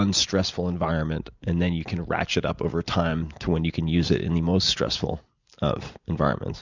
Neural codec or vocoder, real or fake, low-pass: none; real; 7.2 kHz